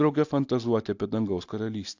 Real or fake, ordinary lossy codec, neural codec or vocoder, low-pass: real; AAC, 48 kbps; none; 7.2 kHz